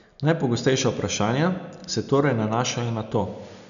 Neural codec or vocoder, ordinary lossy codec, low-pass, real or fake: none; none; 7.2 kHz; real